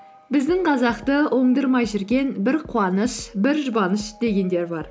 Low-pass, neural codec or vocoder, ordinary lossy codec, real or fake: none; none; none; real